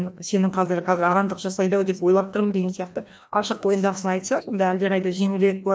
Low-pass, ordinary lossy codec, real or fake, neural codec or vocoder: none; none; fake; codec, 16 kHz, 1 kbps, FreqCodec, larger model